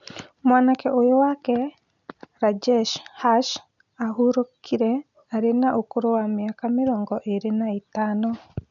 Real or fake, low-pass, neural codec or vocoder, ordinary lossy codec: real; 7.2 kHz; none; none